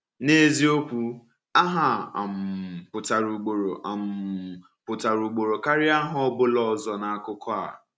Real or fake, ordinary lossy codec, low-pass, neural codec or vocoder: real; none; none; none